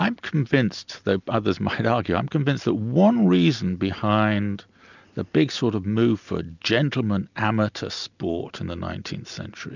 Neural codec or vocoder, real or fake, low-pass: none; real; 7.2 kHz